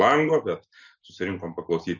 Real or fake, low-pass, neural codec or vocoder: real; 7.2 kHz; none